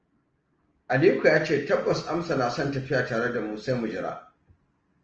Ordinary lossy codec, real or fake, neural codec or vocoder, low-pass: Opus, 24 kbps; real; none; 7.2 kHz